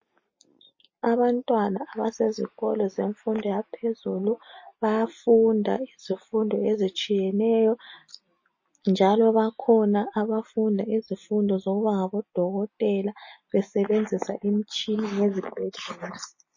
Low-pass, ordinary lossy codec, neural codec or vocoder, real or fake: 7.2 kHz; MP3, 32 kbps; none; real